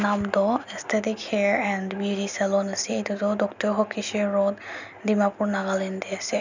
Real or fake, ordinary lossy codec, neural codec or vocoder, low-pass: real; none; none; 7.2 kHz